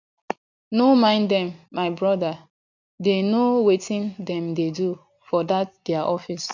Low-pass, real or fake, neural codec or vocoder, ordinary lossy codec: 7.2 kHz; real; none; none